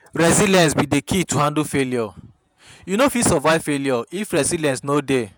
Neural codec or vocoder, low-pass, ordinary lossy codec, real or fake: vocoder, 48 kHz, 128 mel bands, Vocos; none; none; fake